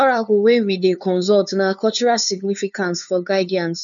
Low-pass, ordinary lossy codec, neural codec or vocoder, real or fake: 7.2 kHz; none; codec, 16 kHz, 2 kbps, FunCodec, trained on LibriTTS, 25 frames a second; fake